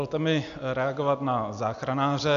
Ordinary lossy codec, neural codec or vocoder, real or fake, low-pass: AAC, 96 kbps; none; real; 7.2 kHz